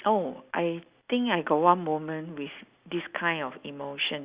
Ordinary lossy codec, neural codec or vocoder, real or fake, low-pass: Opus, 64 kbps; none; real; 3.6 kHz